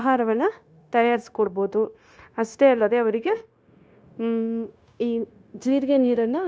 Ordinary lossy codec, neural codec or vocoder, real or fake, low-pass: none; codec, 16 kHz, 0.9 kbps, LongCat-Audio-Codec; fake; none